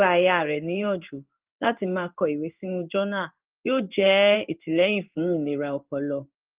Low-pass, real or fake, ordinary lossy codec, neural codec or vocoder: 3.6 kHz; fake; Opus, 24 kbps; codec, 16 kHz in and 24 kHz out, 1 kbps, XY-Tokenizer